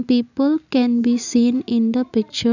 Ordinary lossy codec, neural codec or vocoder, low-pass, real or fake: none; none; 7.2 kHz; real